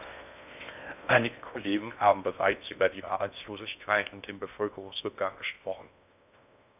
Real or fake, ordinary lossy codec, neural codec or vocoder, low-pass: fake; none; codec, 16 kHz in and 24 kHz out, 0.6 kbps, FocalCodec, streaming, 4096 codes; 3.6 kHz